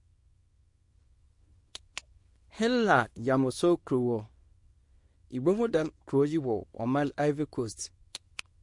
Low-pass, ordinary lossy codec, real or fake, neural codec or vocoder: 10.8 kHz; MP3, 48 kbps; fake; codec, 24 kHz, 0.9 kbps, WavTokenizer, small release